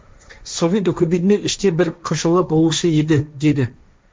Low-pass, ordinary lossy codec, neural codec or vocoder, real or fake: none; none; codec, 16 kHz, 1.1 kbps, Voila-Tokenizer; fake